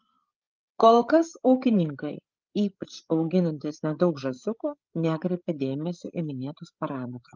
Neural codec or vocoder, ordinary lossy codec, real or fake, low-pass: codec, 16 kHz, 8 kbps, FreqCodec, larger model; Opus, 24 kbps; fake; 7.2 kHz